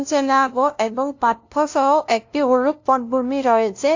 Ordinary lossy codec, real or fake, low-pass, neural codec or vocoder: AAC, 48 kbps; fake; 7.2 kHz; codec, 16 kHz, 0.5 kbps, FunCodec, trained on LibriTTS, 25 frames a second